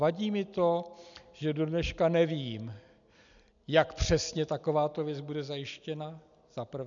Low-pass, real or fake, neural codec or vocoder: 7.2 kHz; real; none